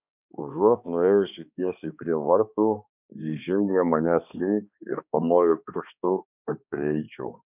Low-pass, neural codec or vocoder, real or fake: 3.6 kHz; codec, 16 kHz, 2 kbps, X-Codec, HuBERT features, trained on balanced general audio; fake